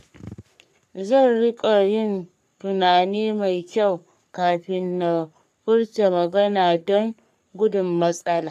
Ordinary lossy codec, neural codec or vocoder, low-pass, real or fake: none; codec, 44.1 kHz, 3.4 kbps, Pupu-Codec; 14.4 kHz; fake